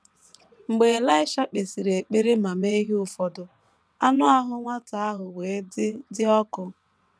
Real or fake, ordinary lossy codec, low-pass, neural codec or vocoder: fake; none; none; vocoder, 22.05 kHz, 80 mel bands, WaveNeXt